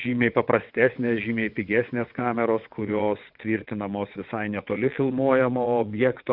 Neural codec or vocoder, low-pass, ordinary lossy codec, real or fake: vocoder, 22.05 kHz, 80 mel bands, WaveNeXt; 5.4 kHz; Opus, 64 kbps; fake